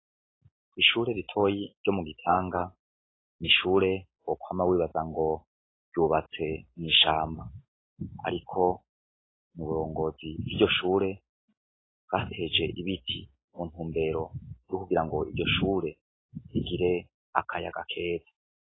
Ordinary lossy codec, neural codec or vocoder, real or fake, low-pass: AAC, 16 kbps; none; real; 7.2 kHz